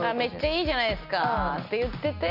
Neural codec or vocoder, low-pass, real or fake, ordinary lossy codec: none; 5.4 kHz; real; none